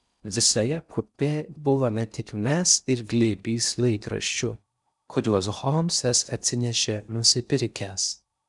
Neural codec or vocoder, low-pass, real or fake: codec, 16 kHz in and 24 kHz out, 0.6 kbps, FocalCodec, streaming, 4096 codes; 10.8 kHz; fake